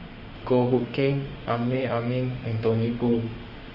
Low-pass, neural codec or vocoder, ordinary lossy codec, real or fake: 5.4 kHz; autoencoder, 48 kHz, 32 numbers a frame, DAC-VAE, trained on Japanese speech; Opus, 24 kbps; fake